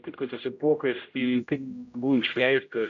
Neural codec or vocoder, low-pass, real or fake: codec, 16 kHz, 0.5 kbps, X-Codec, HuBERT features, trained on general audio; 7.2 kHz; fake